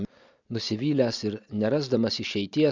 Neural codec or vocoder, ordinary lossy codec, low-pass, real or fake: none; Opus, 64 kbps; 7.2 kHz; real